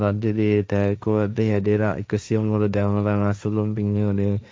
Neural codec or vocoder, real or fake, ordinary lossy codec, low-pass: codec, 16 kHz, 1.1 kbps, Voila-Tokenizer; fake; none; none